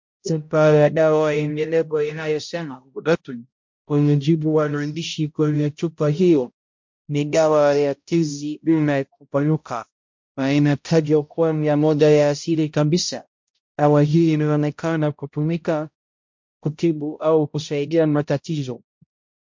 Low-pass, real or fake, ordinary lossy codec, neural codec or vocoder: 7.2 kHz; fake; MP3, 48 kbps; codec, 16 kHz, 0.5 kbps, X-Codec, HuBERT features, trained on balanced general audio